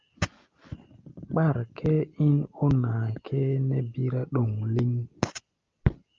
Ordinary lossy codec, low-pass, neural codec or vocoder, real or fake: Opus, 24 kbps; 7.2 kHz; none; real